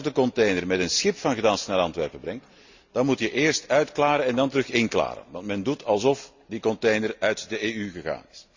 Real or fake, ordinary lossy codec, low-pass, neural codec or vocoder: real; Opus, 64 kbps; 7.2 kHz; none